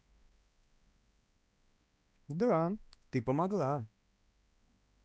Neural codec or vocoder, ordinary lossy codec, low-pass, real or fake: codec, 16 kHz, 2 kbps, X-Codec, HuBERT features, trained on balanced general audio; none; none; fake